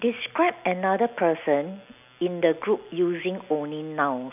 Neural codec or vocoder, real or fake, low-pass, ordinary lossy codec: none; real; 3.6 kHz; none